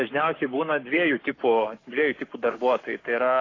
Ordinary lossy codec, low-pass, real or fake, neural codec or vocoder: AAC, 32 kbps; 7.2 kHz; fake; codec, 44.1 kHz, 7.8 kbps, Pupu-Codec